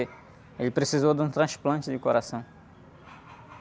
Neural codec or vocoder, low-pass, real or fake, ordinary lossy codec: none; none; real; none